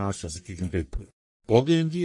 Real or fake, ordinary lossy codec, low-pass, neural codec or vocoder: fake; MP3, 48 kbps; 10.8 kHz; codec, 44.1 kHz, 1.7 kbps, Pupu-Codec